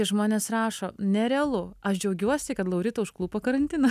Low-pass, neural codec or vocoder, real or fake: 14.4 kHz; none; real